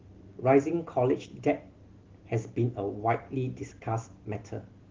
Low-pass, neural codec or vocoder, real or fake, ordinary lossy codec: 7.2 kHz; none; real; Opus, 16 kbps